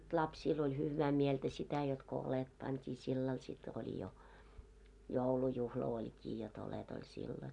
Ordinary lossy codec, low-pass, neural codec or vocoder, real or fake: none; 10.8 kHz; none; real